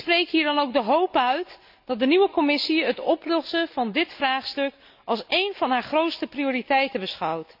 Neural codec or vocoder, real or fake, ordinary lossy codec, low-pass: none; real; none; 5.4 kHz